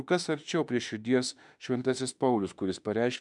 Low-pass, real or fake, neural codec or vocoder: 10.8 kHz; fake; autoencoder, 48 kHz, 32 numbers a frame, DAC-VAE, trained on Japanese speech